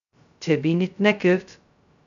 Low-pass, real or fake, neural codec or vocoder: 7.2 kHz; fake; codec, 16 kHz, 0.2 kbps, FocalCodec